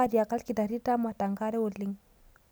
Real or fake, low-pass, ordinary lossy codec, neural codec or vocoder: real; none; none; none